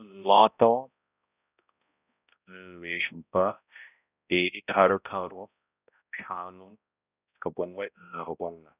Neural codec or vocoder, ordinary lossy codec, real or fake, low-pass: codec, 16 kHz, 0.5 kbps, X-Codec, HuBERT features, trained on balanced general audio; none; fake; 3.6 kHz